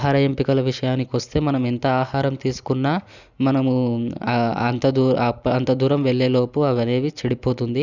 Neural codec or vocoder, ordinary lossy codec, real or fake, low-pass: none; none; real; 7.2 kHz